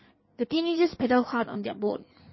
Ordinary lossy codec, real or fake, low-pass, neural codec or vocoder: MP3, 24 kbps; fake; 7.2 kHz; codec, 16 kHz in and 24 kHz out, 1.1 kbps, FireRedTTS-2 codec